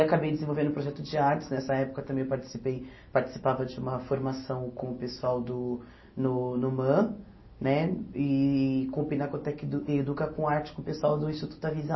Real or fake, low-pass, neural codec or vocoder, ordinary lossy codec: real; 7.2 kHz; none; MP3, 24 kbps